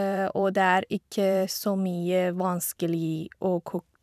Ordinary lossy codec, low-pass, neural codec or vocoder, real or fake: none; 14.4 kHz; vocoder, 44.1 kHz, 128 mel bands every 512 samples, BigVGAN v2; fake